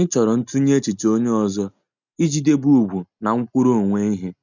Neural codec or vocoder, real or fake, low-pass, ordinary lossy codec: none; real; 7.2 kHz; none